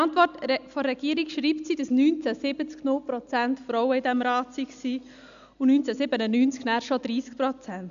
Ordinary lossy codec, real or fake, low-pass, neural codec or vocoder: AAC, 96 kbps; real; 7.2 kHz; none